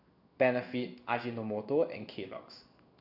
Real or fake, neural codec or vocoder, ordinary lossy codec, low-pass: fake; codec, 16 kHz in and 24 kHz out, 1 kbps, XY-Tokenizer; none; 5.4 kHz